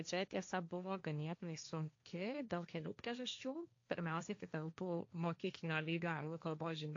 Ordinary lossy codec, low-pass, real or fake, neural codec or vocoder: AAC, 48 kbps; 7.2 kHz; fake; codec, 16 kHz, 1.1 kbps, Voila-Tokenizer